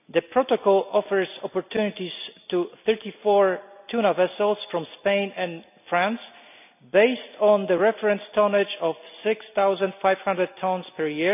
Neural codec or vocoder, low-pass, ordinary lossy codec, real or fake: none; 3.6 kHz; none; real